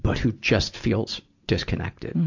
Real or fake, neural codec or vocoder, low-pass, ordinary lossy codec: real; none; 7.2 kHz; AAC, 48 kbps